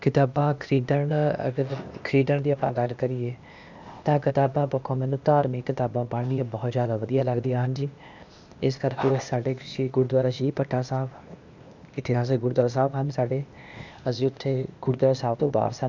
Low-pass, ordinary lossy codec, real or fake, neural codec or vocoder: 7.2 kHz; none; fake; codec, 16 kHz, 0.8 kbps, ZipCodec